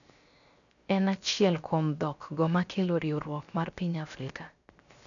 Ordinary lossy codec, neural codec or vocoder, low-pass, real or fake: none; codec, 16 kHz, 0.7 kbps, FocalCodec; 7.2 kHz; fake